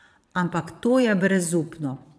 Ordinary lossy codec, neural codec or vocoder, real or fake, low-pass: none; vocoder, 22.05 kHz, 80 mel bands, Vocos; fake; none